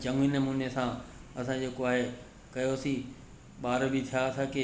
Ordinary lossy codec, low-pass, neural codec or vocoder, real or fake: none; none; none; real